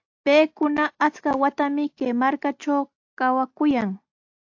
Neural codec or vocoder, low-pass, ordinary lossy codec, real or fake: none; 7.2 kHz; AAC, 48 kbps; real